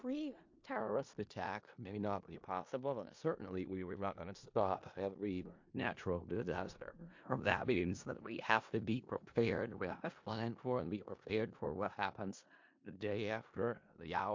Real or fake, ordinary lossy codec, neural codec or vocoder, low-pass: fake; MP3, 48 kbps; codec, 16 kHz in and 24 kHz out, 0.4 kbps, LongCat-Audio-Codec, four codebook decoder; 7.2 kHz